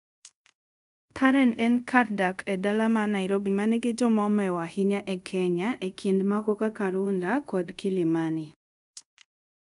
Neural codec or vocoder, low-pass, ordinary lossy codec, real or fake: codec, 24 kHz, 0.5 kbps, DualCodec; 10.8 kHz; none; fake